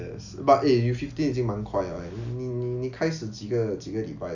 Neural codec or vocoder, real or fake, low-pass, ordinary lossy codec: none; real; 7.2 kHz; none